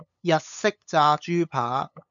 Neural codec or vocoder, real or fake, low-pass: codec, 16 kHz, 8 kbps, FunCodec, trained on LibriTTS, 25 frames a second; fake; 7.2 kHz